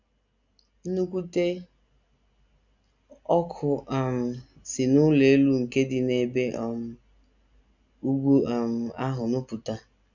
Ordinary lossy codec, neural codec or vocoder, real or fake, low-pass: none; none; real; 7.2 kHz